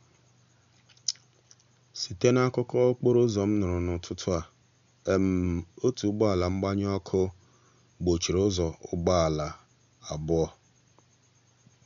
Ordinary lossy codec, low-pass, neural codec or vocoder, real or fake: none; 7.2 kHz; none; real